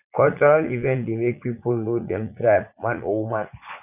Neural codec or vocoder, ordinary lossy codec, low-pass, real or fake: vocoder, 44.1 kHz, 80 mel bands, Vocos; none; 3.6 kHz; fake